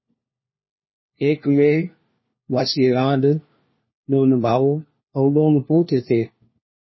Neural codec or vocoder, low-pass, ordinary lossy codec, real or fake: codec, 16 kHz, 1 kbps, FunCodec, trained on LibriTTS, 50 frames a second; 7.2 kHz; MP3, 24 kbps; fake